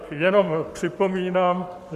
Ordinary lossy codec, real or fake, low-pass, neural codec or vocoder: Opus, 64 kbps; fake; 14.4 kHz; codec, 44.1 kHz, 7.8 kbps, Pupu-Codec